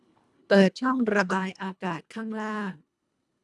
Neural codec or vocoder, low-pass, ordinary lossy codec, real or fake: codec, 24 kHz, 1.5 kbps, HILCodec; none; none; fake